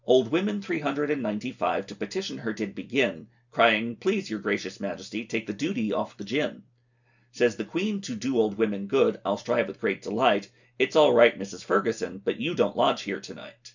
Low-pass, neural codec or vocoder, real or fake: 7.2 kHz; none; real